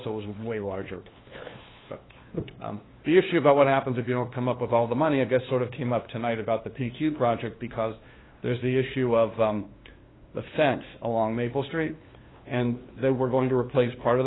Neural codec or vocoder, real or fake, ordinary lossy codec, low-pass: codec, 16 kHz, 2 kbps, FunCodec, trained on LibriTTS, 25 frames a second; fake; AAC, 16 kbps; 7.2 kHz